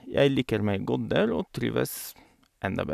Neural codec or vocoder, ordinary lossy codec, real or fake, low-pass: none; none; real; 14.4 kHz